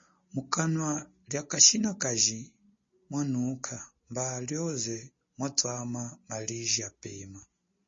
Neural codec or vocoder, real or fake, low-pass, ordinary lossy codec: none; real; 7.2 kHz; MP3, 32 kbps